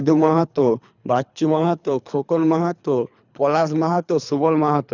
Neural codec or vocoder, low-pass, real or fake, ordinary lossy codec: codec, 24 kHz, 3 kbps, HILCodec; 7.2 kHz; fake; none